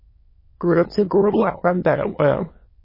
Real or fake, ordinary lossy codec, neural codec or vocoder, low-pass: fake; MP3, 24 kbps; autoencoder, 22.05 kHz, a latent of 192 numbers a frame, VITS, trained on many speakers; 5.4 kHz